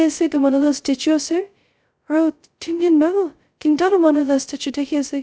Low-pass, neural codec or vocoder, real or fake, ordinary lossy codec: none; codec, 16 kHz, 0.2 kbps, FocalCodec; fake; none